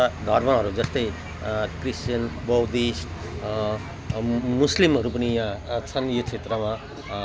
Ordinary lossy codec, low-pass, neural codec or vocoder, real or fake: none; none; none; real